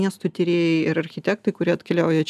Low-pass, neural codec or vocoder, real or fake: 14.4 kHz; none; real